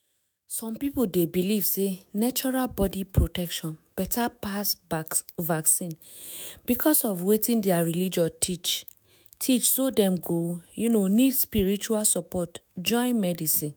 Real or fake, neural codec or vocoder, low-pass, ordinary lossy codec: fake; autoencoder, 48 kHz, 128 numbers a frame, DAC-VAE, trained on Japanese speech; none; none